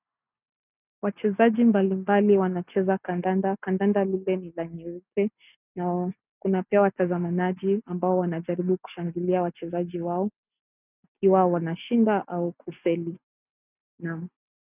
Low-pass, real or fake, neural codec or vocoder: 3.6 kHz; real; none